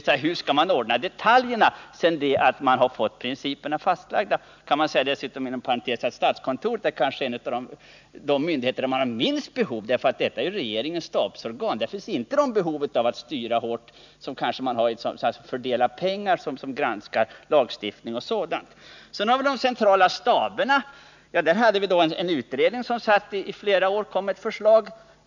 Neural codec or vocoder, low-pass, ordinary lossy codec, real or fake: none; 7.2 kHz; none; real